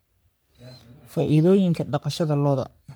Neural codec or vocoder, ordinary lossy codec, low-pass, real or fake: codec, 44.1 kHz, 3.4 kbps, Pupu-Codec; none; none; fake